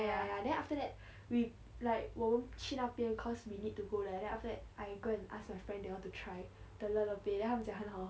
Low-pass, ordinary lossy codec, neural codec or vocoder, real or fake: none; none; none; real